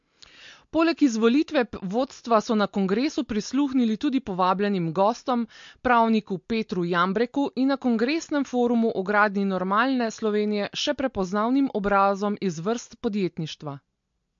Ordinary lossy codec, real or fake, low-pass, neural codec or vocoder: MP3, 48 kbps; real; 7.2 kHz; none